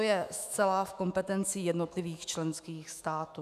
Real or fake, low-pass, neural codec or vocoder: fake; 14.4 kHz; autoencoder, 48 kHz, 128 numbers a frame, DAC-VAE, trained on Japanese speech